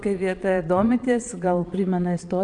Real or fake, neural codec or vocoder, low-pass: fake; vocoder, 22.05 kHz, 80 mel bands, Vocos; 9.9 kHz